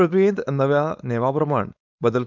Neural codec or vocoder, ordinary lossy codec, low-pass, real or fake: codec, 16 kHz, 4.8 kbps, FACodec; none; 7.2 kHz; fake